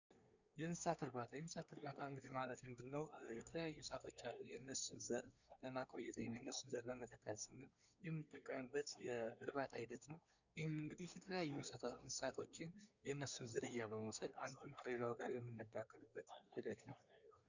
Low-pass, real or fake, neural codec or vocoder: 7.2 kHz; fake; codec, 24 kHz, 1 kbps, SNAC